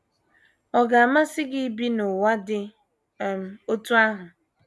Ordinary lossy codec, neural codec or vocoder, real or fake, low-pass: none; none; real; none